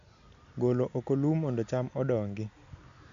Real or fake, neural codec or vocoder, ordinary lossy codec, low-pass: real; none; AAC, 64 kbps; 7.2 kHz